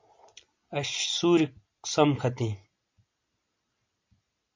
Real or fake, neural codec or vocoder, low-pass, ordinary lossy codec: real; none; 7.2 kHz; MP3, 48 kbps